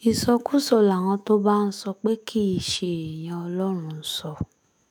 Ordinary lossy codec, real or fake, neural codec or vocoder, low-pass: none; fake; autoencoder, 48 kHz, 128 numbers a frame, DAC-VAE, trained on Japanese speech; none